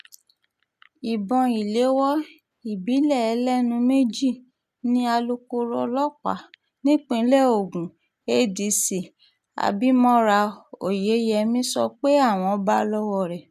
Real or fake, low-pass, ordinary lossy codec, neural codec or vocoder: real; 14.4 kHz; none; none